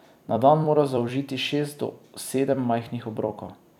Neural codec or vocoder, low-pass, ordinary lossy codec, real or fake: vocoder, 44.1 kHz, 128 mel bands every 256 samples, BigVGAN v2; 19.8 kHz; none; fake